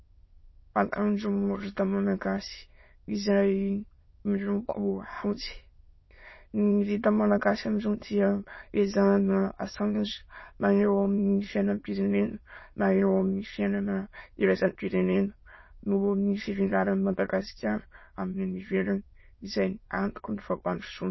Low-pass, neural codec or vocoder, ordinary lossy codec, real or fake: 7.2 kHz; autoencoder, 22.05 kHz, a latent of 192 numbers a frame, VITS, trained on many speakers; MP3, 24 kbps; fake